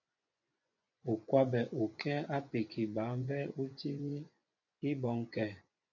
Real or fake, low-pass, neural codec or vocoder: fake; 7.2 kHz; vocoder, 24 kHz, 100 mel bands, Vocos